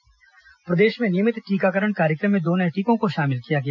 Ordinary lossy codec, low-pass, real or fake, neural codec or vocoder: none; 7.2 kHz; real; none